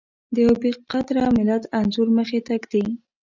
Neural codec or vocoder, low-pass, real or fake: none; 7.2 kHz; real